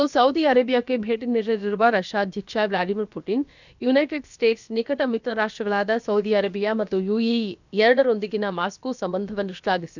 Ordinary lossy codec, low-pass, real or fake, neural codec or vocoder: none; 7.2 kHz; fake; codec, 16 kHz, about 1 kbps, DyCAST, with the encoder's durations